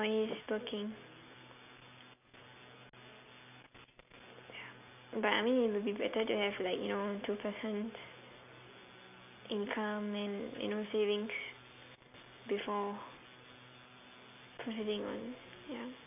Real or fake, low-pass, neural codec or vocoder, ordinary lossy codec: real; 3.6 kHz; none; none